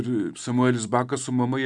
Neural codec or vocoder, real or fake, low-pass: none; real; 10.8 kHz